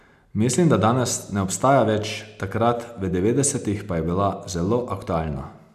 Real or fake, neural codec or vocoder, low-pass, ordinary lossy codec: real; none; 14.4 kHz; none